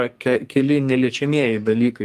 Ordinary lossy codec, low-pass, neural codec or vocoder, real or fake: Opus, 32 kbps; 14.4 kHz; codec, 44.1 kHz, 2.6 kbps, SNAC; fake